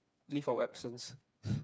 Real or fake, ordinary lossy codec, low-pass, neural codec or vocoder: fake; none; none; codec, 16 kHz, 4 kbps, FreqCodec, smaller model